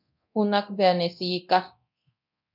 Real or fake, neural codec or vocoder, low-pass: fake; codec, 24 kHz, 0.9 kbps, DualCodec; 5.4 kHz